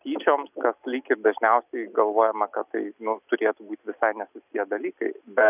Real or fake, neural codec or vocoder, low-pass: real; none; 3.6 kHz